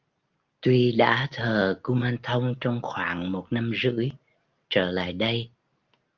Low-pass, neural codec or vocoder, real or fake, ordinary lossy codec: 7.2 kHz; none; real; Opus, 24 kbps